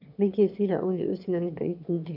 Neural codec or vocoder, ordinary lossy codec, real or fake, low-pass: autoencoder, 22.05 kHz, a latent of 192 numbers a frame, VITS, trained on one speaker; none; fake; 5.4 kHz